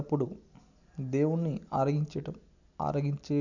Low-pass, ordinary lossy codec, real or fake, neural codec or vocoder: 7.2 kHz; none; real; none